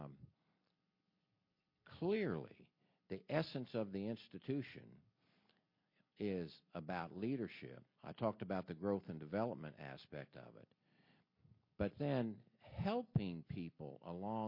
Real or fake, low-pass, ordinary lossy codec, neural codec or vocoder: real; 5.4 kHz; MP3, 32 kbps; none